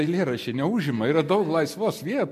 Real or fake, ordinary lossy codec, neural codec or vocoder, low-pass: real; MP3, 64 kbps; none; 14.4 kHz